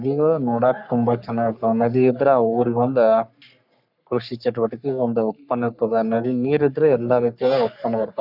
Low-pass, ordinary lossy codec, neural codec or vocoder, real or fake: 5.4 kHz; none; codec, 44.1 kHz, 3.4 kbps, Pupu-Codec; fake